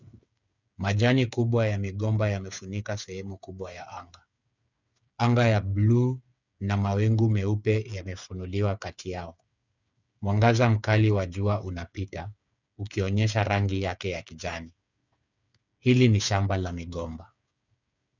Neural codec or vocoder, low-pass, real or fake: codec, 16 kHz, 8 kbps, FreqCodec, smaller model; 7.2 kHz; fake